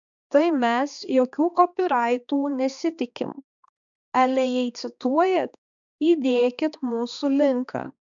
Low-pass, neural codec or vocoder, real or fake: 7.2 kHz; codec, 16 kHz, 2 kbps, X-Codec, HuBERT features, trained on balanced general audio; fake